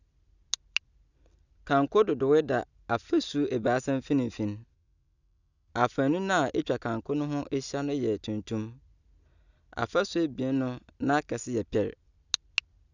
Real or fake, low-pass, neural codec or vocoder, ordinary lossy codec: real; 7.2 kHz; none; none